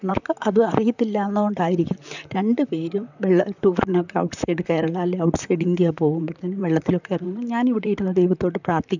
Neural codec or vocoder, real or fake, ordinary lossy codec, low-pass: vocoder, 44.1 kHz, 128 mel bands, Pupu-Vocoder; fake; none; 7.2 kHz